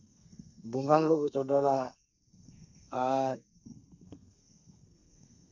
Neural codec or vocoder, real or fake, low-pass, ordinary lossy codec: codec, 32 kHz, 1.9 kbps, SNAC; fake; 7.2 kHz; none